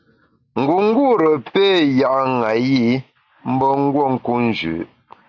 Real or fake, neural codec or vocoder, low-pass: real; none; 7.2 kHz